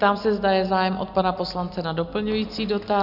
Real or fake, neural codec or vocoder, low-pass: real; none; 5.4 kHz